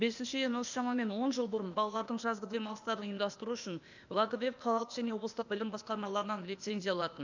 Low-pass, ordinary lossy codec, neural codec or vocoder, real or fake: 7.2 kHz; none; codec, 16 kHz, 0.8 kbps, ZipCodec; fake